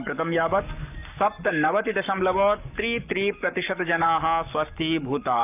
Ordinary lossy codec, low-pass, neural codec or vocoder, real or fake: none; 3.6 kHz; codec, 44.1 kHz, 7.8 kbps, Pupu-Codec; fake